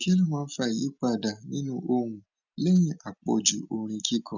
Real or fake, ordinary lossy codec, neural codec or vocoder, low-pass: real; none; none; 7.2 kHz